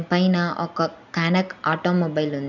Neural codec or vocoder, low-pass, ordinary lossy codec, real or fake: none; 7.2 kHz; none; real